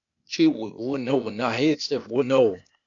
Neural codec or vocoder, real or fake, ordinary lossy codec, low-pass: codec, 16 kHz, 0.8 kbps, ZipCodec; fake; MP3, 64 kbps; 7.2 kHz